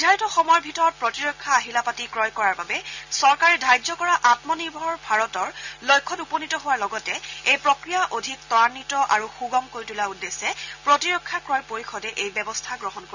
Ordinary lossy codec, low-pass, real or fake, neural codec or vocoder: none; 7.2 kHz; real; none